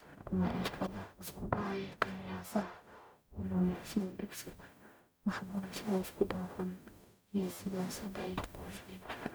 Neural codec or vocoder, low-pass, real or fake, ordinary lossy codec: codec, 44.1 kHz, 0.9 kbps, DAC; none; fake; none